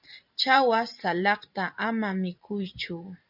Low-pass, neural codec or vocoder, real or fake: 5.4 kHz; vocoder, 44.1 kHz, 128 mel bands every 512 samples, BigVGAN v2; fake